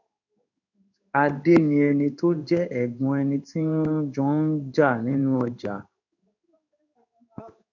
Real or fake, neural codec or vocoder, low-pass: fake; codec, 16 kHz in and 24 kHz out, 1 kbps, XY-Tokenizer; 7.2 kHz